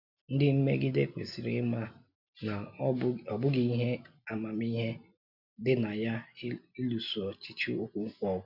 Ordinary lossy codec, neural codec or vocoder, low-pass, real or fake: none; none; 5.4 kHz; real